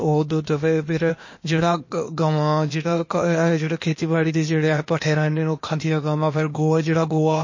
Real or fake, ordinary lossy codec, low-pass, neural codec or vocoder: fake; MP3, 32 kbps; 7.2 kHz; codec, 16 kHz, 0.8 kbps, ZipCodec